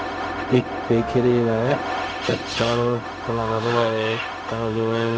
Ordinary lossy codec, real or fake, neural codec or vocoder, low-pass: none; fake; codec, 16 kHz, 0.4 kbps, LongCat-Audio-Codec; none